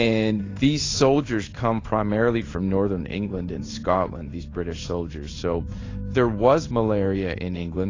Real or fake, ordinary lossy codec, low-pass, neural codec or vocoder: fake; AAC, 32 kbps; 7.2 kHz; codec, 16 kHz in and 24 kHz out, 1 kbps, XY-Tokenizer